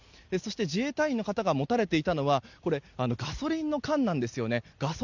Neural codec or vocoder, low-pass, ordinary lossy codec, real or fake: none; 7.2 kHz; none; real